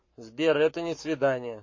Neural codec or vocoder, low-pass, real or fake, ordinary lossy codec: codec, 44.1 kHz, 7.8 kbps, Pupu-Codec; 7.2 kHz; fake; MP3, 32 kbps